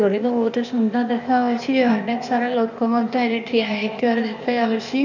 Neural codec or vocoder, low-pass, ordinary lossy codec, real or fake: codec, 16 kHz, 0.8 kbps, ZipCodec; 7.2 kHz; none; fake